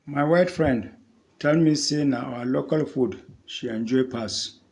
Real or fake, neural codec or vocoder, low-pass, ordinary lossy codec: real; none; 10.8 kHz; AAC, 64 kbps